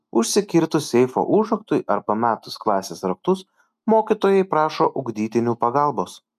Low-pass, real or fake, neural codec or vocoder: 14.4 kHz; real; none